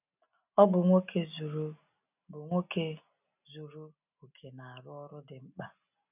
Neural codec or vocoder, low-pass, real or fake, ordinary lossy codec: none; 3.6 kHz; real; none